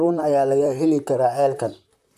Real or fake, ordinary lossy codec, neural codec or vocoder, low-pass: fake; none; vocoder, 44.1 kHz, 128 mel bands, Pupu-Vocoder; 14.4 kHz